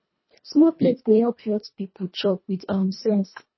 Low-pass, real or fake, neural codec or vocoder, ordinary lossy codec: 7.2 kHz; fake; codec, 24 kHz, 1.5 kbps, HILCodec; MP3, 24 kbps